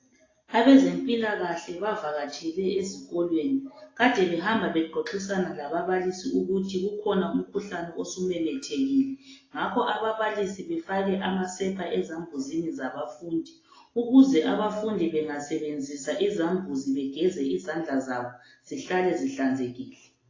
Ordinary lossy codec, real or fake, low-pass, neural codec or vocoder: AAC, 32 kbps; real; 7.2 kHz; none